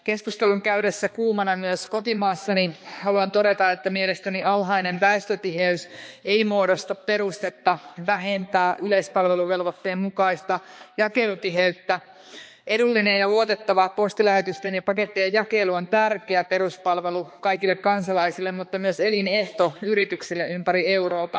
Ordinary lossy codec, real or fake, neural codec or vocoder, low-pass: none; fake; codec, 16 kHz, 2 kbps, X-Codec, HuBERT features, trained on balanced general audio; none